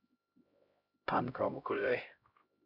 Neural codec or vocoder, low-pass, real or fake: codec, 16 kHz, 0.5 kbps, X-Codec, HuBERT features, trained on LibriSpeech; 5.4 kHz; fake